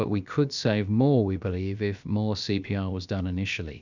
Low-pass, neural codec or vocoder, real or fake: 7.2 kHz; codec, 16 kHz, about 1 kbps, DyCAST, with the encoder's durations; fake